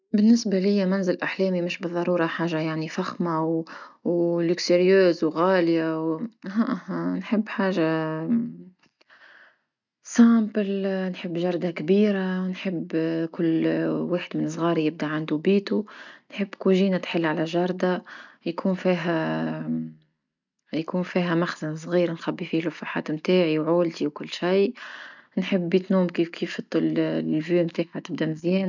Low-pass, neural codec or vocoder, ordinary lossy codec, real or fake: 7.2 kHz; none; none; real